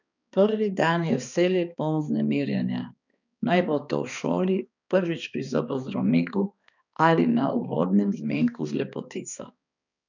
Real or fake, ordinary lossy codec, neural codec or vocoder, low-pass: fake; none; codec, 16 kHz, 2 kbps, X-Codec, HuBERT features, trained on balanced general audio; 7.2 kHz